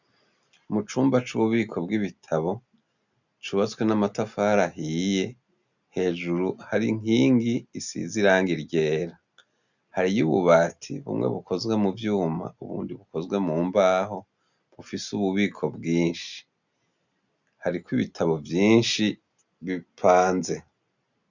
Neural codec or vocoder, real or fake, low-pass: none; real; 7.2 kHz